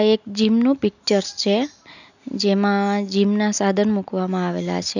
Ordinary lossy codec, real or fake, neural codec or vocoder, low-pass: none; real; none; 7.2 kHz